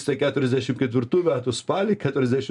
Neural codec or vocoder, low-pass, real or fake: none; 10.8 kHz; real